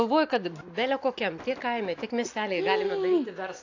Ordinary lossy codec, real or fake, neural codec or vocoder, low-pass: AAC, 48 kbps; real; none; 7.2 kHz